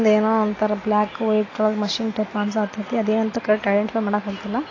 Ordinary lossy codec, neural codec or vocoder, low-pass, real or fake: AAC, 32 kbps; none; 7.2 kHz; real